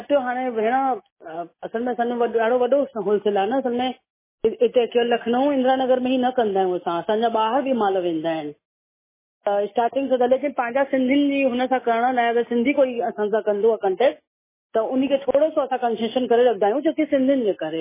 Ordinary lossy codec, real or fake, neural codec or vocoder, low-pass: MP3, 16 kbps; real; none; 3.6 kHz